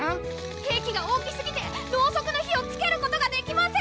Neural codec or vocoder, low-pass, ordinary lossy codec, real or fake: none; none; none; real